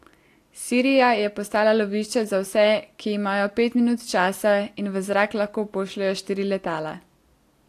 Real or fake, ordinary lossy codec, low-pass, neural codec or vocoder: real; AAC, 64 kbps; 14.4 kHz; none